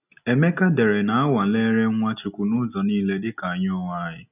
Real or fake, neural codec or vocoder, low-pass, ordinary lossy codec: real; none; 3.6 kHz; none